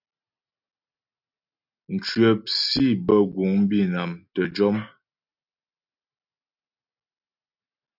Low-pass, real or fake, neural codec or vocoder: 5.4 kHz; real; none